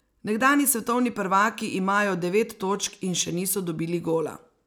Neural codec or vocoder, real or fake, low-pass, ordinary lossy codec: none; real; none; none